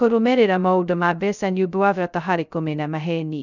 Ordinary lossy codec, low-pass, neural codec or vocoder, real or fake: none; 7.2 kHz; codec, 16 kHz, 0.2 kbps, FocalCodec; fake